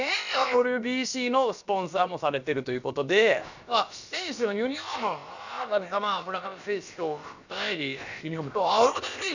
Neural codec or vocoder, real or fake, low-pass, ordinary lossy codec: codec, 16 kHz, about 1 kbps, DyCAST, with the encoder's durations; fake; 7.2 kHz; none